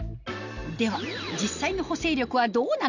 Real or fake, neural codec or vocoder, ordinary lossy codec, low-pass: real; none; none; 7.2 kHz